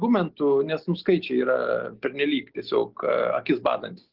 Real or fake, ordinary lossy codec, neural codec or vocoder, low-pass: real; Opus, 16 kbps; none; 5.4 kHz